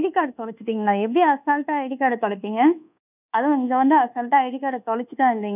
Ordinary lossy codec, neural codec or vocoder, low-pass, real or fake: none; codec, 24 kHz, 1.2 kbps, DualCodec; 3.6 kHz; fake